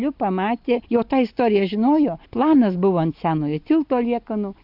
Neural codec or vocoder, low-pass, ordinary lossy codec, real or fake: none; 5.4 kHz; AAC, 48 kbps; real